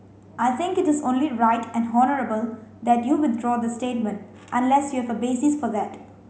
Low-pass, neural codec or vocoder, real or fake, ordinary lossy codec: none; none; real; none